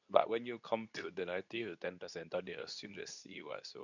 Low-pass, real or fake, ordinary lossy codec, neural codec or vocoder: 7.2 kHz; fake; none; codec, 24 kHz, 0.9 kbps, WavTokenizer, medium speech release version 2